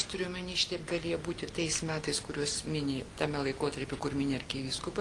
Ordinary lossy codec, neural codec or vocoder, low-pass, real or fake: Opus, 24 kbps; none; 10.8 kHz; real